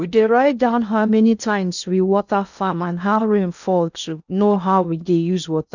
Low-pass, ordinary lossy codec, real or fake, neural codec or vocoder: 7.2 kHz; none; fake; codec, 16 kHz in and 24 kHz out, 0.6 kbps, FocalCodec, streaming, 2048 codes